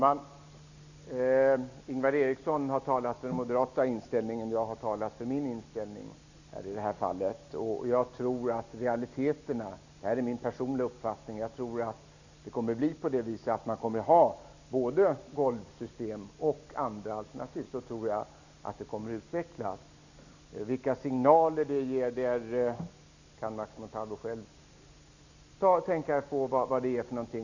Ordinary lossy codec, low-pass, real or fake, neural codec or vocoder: none; 7.2 kHz; real; none